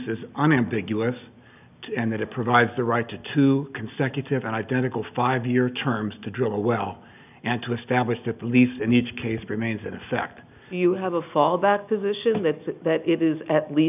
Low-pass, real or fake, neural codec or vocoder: 3.6 kHz; real; none